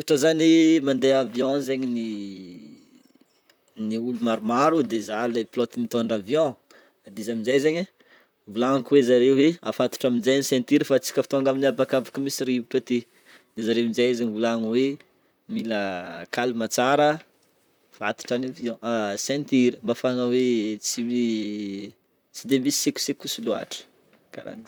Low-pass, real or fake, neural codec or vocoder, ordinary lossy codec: none; fake; vocoder, 44.1 kHz, 128 mel bands, Pupu-Vocoder; none